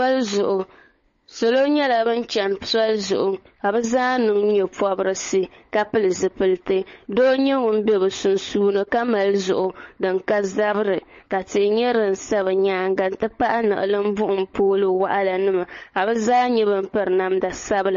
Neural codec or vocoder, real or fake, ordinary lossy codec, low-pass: codec, 16 kHz, 16 kbps, FunCodec, trained on LibriTTS, 50 frames a second; fake; MP3, 32 kbps; 7.2 kHz